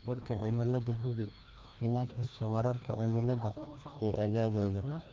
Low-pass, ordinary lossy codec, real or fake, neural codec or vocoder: 7.2 kHz; Opus, 32 kbps; fake; codec, 16 kHz, 1 kbps, FreqCodec, larger model